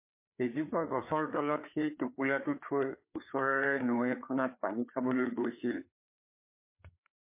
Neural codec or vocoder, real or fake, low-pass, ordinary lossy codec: codec, 16 kHz, 4 kbps, FreqCodec, larger model; fake; 3.6 kHz; MP3, 24 kbps